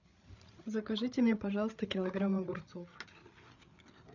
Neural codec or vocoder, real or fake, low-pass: codec, 16 kHz, 8 kbps, FreqCodec, larger model; fake; 7.2 kHz